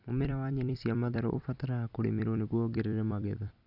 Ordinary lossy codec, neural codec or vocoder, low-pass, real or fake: none; vocoder, 44.1 kHz, 128 mel bands every 256 samples, BigVGAN v2; 5.4 kHz; fake